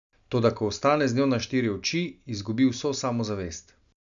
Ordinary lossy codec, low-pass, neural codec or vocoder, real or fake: none; 7.2 kHz; none; real